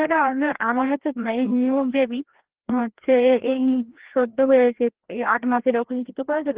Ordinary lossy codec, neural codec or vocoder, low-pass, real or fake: Opus, 16 kbps; codec, 16 kHz, 1 kbps, FreqCodec, larger model; 3.6 kHz; fake